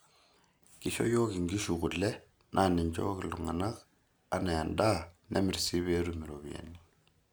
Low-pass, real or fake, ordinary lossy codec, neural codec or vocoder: none; real; none; none